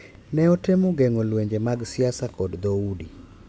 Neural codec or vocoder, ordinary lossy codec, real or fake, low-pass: none; none; real; none